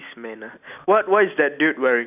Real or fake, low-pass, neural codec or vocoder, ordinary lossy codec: real; 3.6 kHz; none; none